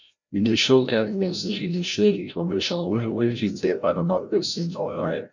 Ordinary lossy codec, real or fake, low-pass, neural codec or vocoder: none; fake; 7.2 kHz; codec, 16 kHz, 0.5 kbps, FreqCodec, larger model